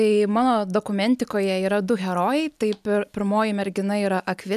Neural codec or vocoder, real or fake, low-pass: none; real; 14.4 kHz